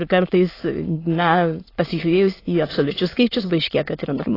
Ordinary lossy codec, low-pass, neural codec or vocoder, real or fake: AAC, 24 kbps; 5.4 kHz; autoencoder, 22.05 kHz, a latent of 192 numbers a frame, VITS, trained on many speakers; fake